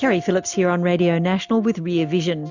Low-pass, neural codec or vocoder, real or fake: 7.2 kHz; none; real